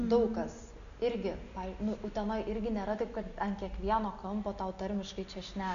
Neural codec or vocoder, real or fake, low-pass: none; real; 7.2 kHz